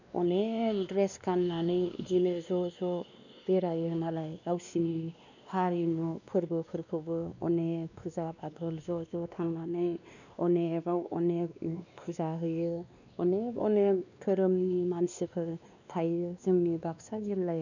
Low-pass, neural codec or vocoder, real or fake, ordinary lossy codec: 7.2 kHz; codec, 16 kHz, 2 kbps, X-Codec, WavLM features, trained on Multilingual LibriSpeech; fake; none